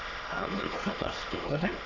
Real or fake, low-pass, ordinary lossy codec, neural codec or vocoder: fake; 7.2 kHz; none; autoencoder, 22.05 kHz, a latent of 192 numbers a frame, VITS, trained on many speakers